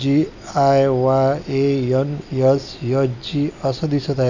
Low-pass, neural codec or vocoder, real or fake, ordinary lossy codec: 7.2 kHz; none; real; none